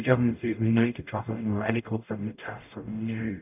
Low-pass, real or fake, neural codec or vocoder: 3.6 kHz; fake; codec, 44.1 kHz, 0.9 kbps, DAC